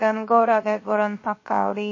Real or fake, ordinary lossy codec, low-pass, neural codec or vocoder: fake; MP3, 32 kbps; 7.2 kHz; codec, 16 kHz, 0.7 kbps, FocalCodec